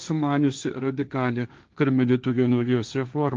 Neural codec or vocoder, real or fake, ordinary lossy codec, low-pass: codec, 16 kHz, 1.1 kbps, Voila-Tokenizer; fake; Opus, 32 kbps; 7.2 kHz